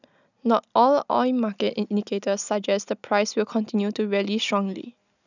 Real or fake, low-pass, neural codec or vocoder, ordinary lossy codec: real; 7.2 kHz; none; none